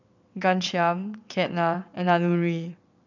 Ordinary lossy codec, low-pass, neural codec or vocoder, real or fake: none; 7.2 kHz; vocoder, 22.05 kHz, 80 mel bands, WaveNeXt; fake